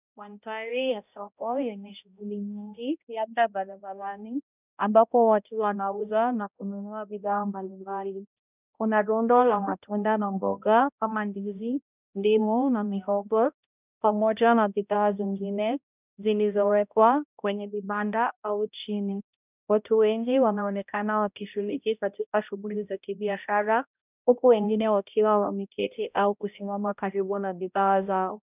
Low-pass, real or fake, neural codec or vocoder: 3.6 kHz; fake; codec, 16 kHz, 0.5 kbps, X-Codec, HuBERT features, trained on balanced general audio